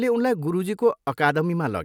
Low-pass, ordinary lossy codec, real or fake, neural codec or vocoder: 19.8 kHz; none; real; none